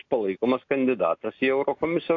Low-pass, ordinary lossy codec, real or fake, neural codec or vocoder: 7.2 kHz; AAC, 48 kbps; real; none